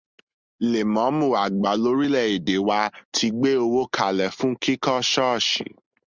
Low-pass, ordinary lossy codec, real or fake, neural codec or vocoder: 7.2 kHz; Opus, 64 kbps; real; none